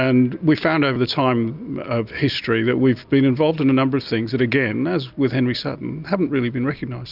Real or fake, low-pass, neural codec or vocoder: real; 5.4 kHz; none